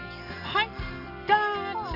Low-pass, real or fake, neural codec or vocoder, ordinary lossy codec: 5.4 kHz; real; none; none